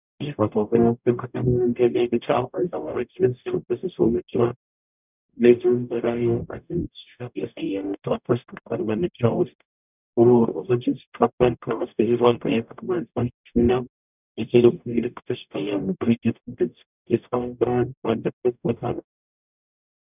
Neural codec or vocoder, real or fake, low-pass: codec, 44.1 kHz, 0.9 kbps, DAC; fake; 3.6 kHz